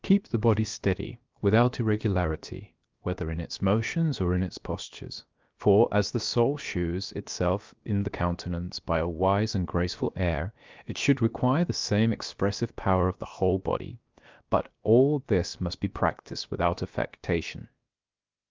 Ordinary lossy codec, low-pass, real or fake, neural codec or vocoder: Opus, 24 kbps; 7.2 kHz; fake; codec, 16 kHz, about 1 kbps, DyCAST, with the encoder's durations